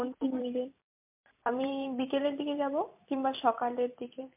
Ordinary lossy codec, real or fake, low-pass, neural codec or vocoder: MP3, 32 kbps; fake; 3.6 kHz; vocoder, 44.1 kHz, 128 mel bands every 256 samples, BigVGAN v2